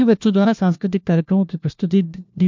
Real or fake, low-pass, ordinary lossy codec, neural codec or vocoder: fake; 7.2 kHz; MP3, 64 kbps; codec, 16 kHz, 1 kbps, FunCodec, trained on LibriTTS, 50 frames a second